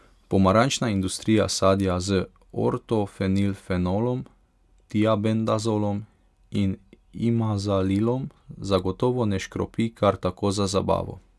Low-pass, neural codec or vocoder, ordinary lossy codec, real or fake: none; none; none; real